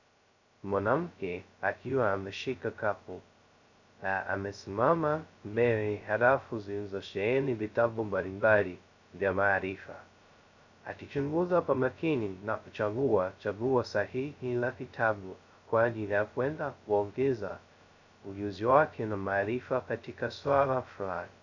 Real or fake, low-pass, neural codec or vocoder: fake; 7.2 kHz; codec, 16 kHz, 0.2 kbps, FocalCodec